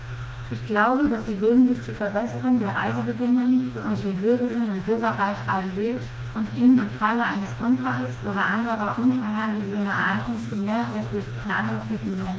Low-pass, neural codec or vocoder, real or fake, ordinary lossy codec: none; codec, 16 kHz, 1 kbps, FreqCodec, smaller model; fake; none